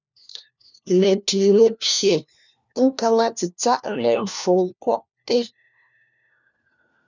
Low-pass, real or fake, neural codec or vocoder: 7.2 kHz; fake; codec, 16 kHz, 1 kbps, FunCodec, trained on LibriTTS, 50 frames a second